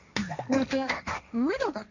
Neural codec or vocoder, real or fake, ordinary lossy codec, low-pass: codec, 16 kHz, 1.1 kbps, Voila-Tokenizer; fake; none; 7.2 kHz